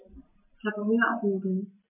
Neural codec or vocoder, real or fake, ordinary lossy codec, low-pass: none; real; MP3, 32 kbps; 3.6 kHz